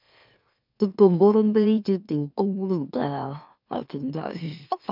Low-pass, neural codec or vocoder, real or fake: 5.4 kHz; autoencoder, 44.1 kHz, a latent of 192 numbers a frame, MeloTTS; fake